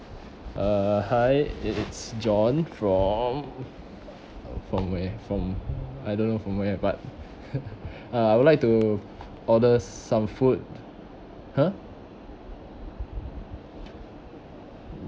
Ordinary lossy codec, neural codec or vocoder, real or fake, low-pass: none; none; real; none